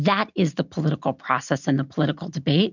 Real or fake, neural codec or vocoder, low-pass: real; none; 7.2 kHz